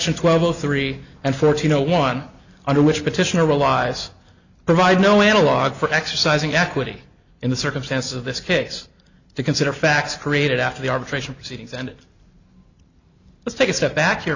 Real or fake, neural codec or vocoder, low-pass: real; none; 7.2 kHz